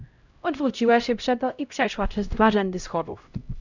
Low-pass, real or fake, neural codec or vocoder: 7.2 kHz; fake; codec, 16 kHz, 0.5 kbps, X-Codec, HuBERT features, trained on LibriSpeech